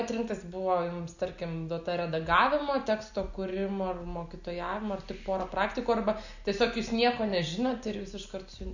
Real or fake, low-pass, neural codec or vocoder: real; 7.2 kHz; none